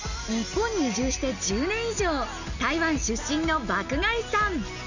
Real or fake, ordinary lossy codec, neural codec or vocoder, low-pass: real; none; none; 7.2 kHz